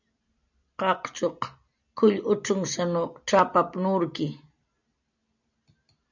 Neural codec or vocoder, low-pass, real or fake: none; 7.2 kHz; real